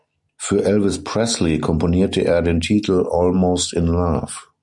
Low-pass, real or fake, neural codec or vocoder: 10.8 kHz; real; none